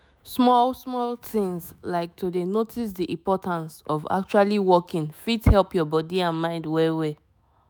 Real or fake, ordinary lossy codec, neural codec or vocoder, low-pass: fake; none; autoencoder, 48 kHz, 128 numbers a frame, DAC-VAE, trained on Japanese speech; none